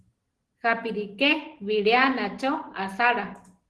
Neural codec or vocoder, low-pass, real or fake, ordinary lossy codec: none; 10.8 kHz; real; Opus, 16 kbps